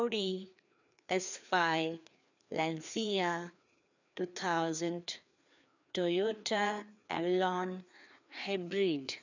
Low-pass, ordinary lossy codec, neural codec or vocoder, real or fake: 7.2 kHz; none; codec, 16 kHz, 2 kbps, FreqCodec, larger model; fake